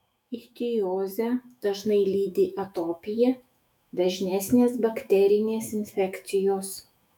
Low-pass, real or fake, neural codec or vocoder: 19.8 kHz; fake; autoencoder, 48 kHz, 128 numbers a frame, DAC-VAE, trained on Japanese speech